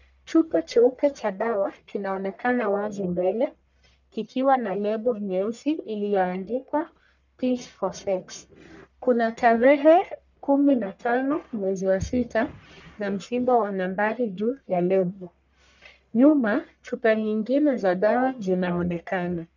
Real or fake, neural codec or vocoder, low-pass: fake; codec, 44.1 kHz, 1.7 kbps, Pupu-Codec; 7.2 kHz